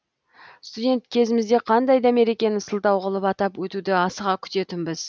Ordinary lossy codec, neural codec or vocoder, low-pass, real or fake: none; none; none; real